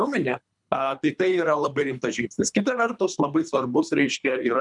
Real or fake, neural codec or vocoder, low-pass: fake; codec, 24 kHz, 3 kbps, HILCodec; 10.8 kHz